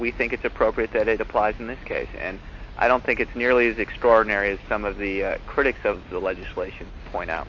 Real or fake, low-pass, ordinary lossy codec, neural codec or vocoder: real; 7.2 kHz; AAC, 48 kbps; none